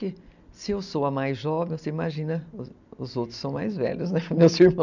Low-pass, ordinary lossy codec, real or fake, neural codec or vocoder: 7.2 kHz; none; real; none